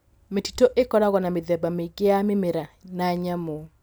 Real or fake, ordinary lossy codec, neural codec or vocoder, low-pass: real; none; none; none